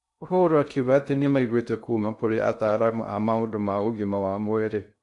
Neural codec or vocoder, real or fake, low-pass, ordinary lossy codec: codec, 16 kHz in and 24 kHz out, 0.6 kbps, FocalCodec, streaming, 2048 codes; fake; 10.8 kHz; AAC, 64 kbps